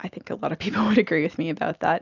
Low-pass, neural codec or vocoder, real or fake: 7.2 kHz; none; real